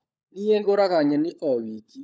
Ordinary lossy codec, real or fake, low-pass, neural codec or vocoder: none; fake; none; codec, 16 kHz, 16 kbps, FunCodec, trained on LibriTTS, 50 frames a second